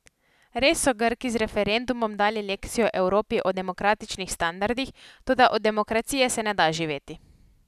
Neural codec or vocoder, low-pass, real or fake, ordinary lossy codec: none; 14.4 kHz; real; none